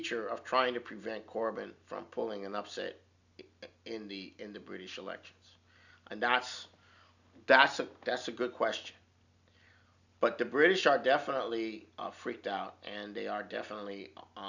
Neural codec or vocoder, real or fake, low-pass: none; real; 7.2 kHz